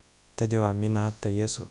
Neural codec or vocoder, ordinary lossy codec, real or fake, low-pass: codec, 24 kHz, 0.9 kbps, WavTokenizer, large speech release; none; fake; 10.8 kHz